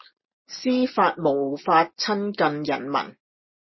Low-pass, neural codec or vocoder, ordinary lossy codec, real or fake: 7.2 kHz; none; MP3, 24 kbps; real